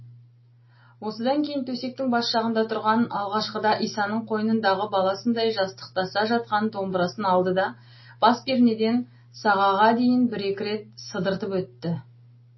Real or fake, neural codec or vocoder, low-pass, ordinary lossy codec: real; none; 7.2 kHz; MP3, 24 kbps